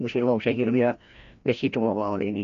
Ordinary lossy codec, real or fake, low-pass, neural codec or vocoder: AAC, 64 kbps; fake; 7.2 kHz; codec, 16 kHz, 1 kbps, FreqCodec, larger model